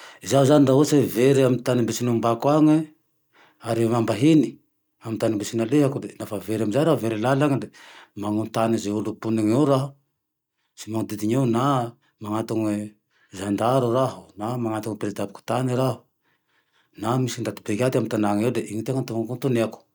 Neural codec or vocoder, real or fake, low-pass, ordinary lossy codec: none; real; none; none